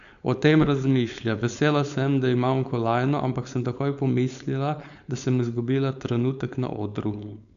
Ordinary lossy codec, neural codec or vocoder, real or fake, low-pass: none; codec, 16 kHz, 4.8 kbps, FACodec; fake; 7.2 kHz